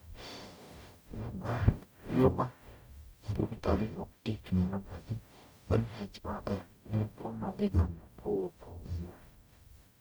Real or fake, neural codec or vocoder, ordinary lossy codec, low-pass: fake; codec, 44.1 kHz, 0.9 kbps, DAC; none; none